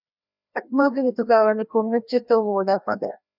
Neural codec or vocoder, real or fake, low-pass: codec, 16 kHz, 1 kbps, FreqCodec, larger model; fake; 5.4 kHz